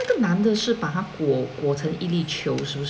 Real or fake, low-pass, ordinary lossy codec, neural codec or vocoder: real; none; none; none